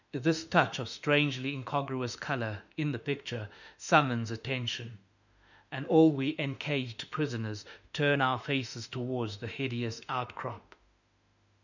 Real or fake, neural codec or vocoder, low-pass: fake; autoencoder, 48 kHz, 32 numbers a frame, DAC-VAE, trained on Japanese speech; 7.2 kHz